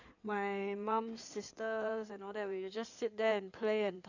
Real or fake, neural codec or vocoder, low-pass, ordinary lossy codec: fake; codec, 16 kHz in and 24 kHz out, 2.2 kbps, FireRedTTS-2 codec; 7.2 kHz; none